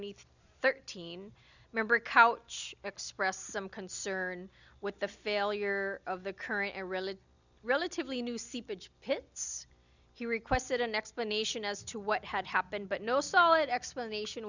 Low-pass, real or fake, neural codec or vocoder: 7.2 kHz; real; none